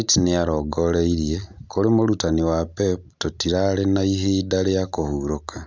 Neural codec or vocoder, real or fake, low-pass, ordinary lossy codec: none; real; none; none